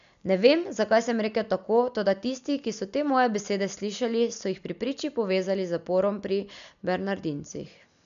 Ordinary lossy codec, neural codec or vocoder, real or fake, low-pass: none; none; real; 7.2 kHz